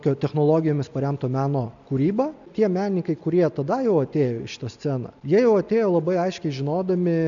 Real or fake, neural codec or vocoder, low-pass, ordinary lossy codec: real; none; 7.2 kHz; MP3, 96 kbps